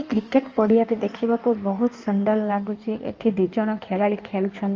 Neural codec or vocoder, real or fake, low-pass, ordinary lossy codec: codec, 16 kHz in and 24 kHz out, 1.1 kbps, FireRedTTS-2 codec; fake; 7.2 kHz; Opus, 24 kbps